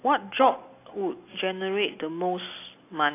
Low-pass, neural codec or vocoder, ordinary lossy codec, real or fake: 3.6 kHz; none; AAC, 24 kbps; real